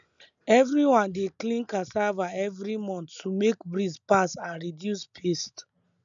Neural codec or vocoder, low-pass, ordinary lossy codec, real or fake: none; 7.2 kHz; none; real